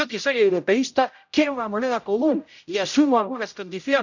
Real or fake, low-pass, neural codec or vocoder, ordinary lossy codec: fake; 7.2 kHz; codec, 16 kHz, 0.5 kbps, X-Codec, HuBERT features, trained on general audio; none